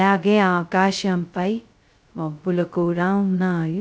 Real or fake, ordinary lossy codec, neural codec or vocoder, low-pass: fake; none; codec, 16 kHz, 0.2 kbps, FocalCodec; none